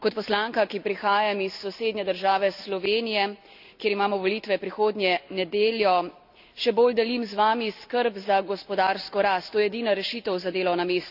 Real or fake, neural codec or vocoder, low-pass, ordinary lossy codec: real; none; 5.4 kHz; none